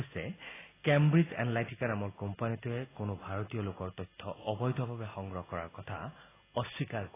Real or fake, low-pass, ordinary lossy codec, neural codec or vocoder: real; 3.6 kHz; AAC, 16 kbps; none